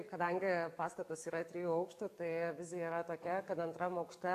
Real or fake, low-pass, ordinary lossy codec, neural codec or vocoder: fake; 14.4 kHz; AAC, 64 kbps; codec, 44.1 kHz, 7.8 kbps, DAC